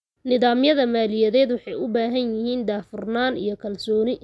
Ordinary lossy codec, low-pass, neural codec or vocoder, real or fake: none; none; none; real